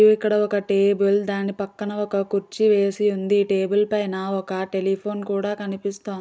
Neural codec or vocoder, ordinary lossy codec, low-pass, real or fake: none; none; none; real